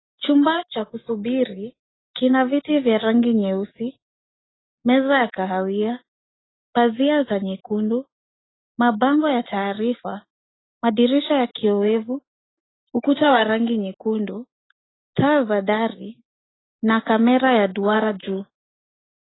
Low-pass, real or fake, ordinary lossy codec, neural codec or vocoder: 7.2 kHz; real; AAC, 16 kbps; none